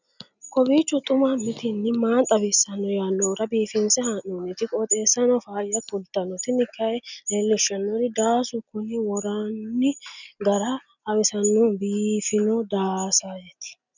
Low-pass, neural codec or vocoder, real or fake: 7.2 kHz; none; real